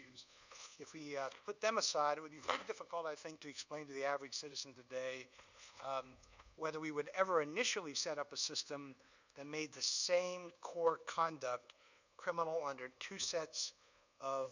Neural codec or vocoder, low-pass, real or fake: codec, 24 kHz, 1.2 kbps, DualCodec; 7.2 kHz; fake